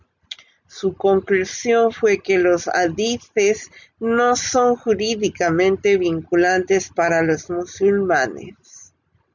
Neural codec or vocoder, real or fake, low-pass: none; real; 7.2 kHz